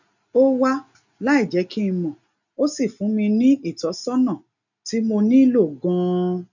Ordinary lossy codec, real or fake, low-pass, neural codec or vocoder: none; real; 7.2 kHz; none